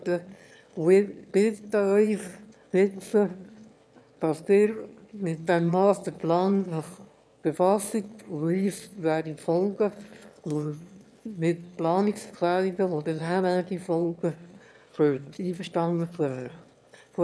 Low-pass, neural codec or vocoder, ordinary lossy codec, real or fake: none; autoencoder, 22.05 kHz, a latent of 192 numbers a frame, VITS, trained on one speaker; none; fake